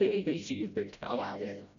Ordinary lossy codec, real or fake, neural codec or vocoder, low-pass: none; fake; codec, 16 kHz, 0.5 kbps, FreqCodec, smaller model; 7.2 kHz